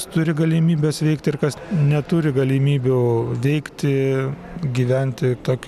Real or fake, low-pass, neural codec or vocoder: real; 14.4 kHz; none